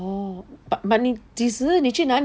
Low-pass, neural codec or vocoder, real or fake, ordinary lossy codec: none; none; real; none